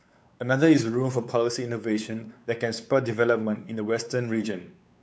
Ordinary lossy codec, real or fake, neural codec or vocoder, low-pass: none; fake; codec, 16 kHz, 4 kbps, X-Codec, WavLM features, trained on Multilingual LibriSpeech; none